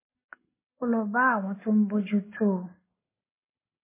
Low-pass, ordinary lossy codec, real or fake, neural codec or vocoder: 3.6 kHz; MP3, 16 kbps; real; none